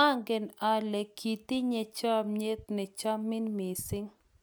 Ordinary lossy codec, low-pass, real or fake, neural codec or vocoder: none; none; real; none